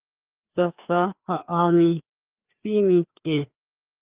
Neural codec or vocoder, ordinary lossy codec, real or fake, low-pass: codec, 16 kHz, 2 kbps, FreqCodec, larger model; Opus, 24 kbps; fake; 3.6 kHz